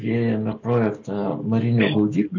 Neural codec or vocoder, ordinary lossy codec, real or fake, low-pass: none; MP3, 48 kbps; real; 7.2 kHz